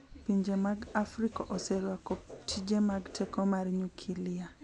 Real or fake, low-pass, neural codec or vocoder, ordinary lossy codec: real; 10.8 kHz; none; none